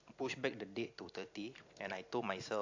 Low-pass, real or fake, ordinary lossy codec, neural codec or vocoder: 7.2 kHz; real; AAC, 48 kbps; none